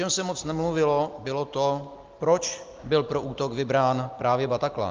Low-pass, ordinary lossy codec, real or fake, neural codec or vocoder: 7.2 kHz; Opus, 24 kbps; real; none